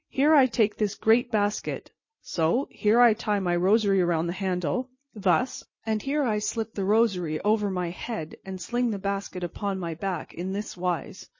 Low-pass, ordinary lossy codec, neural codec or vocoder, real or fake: 7.2 kHz; MP3, 32 kbps; none; real